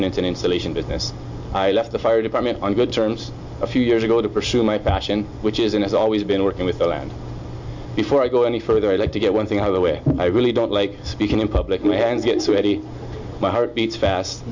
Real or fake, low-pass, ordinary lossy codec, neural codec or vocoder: real; 7.2 kHz; MP3, 48 kbps; none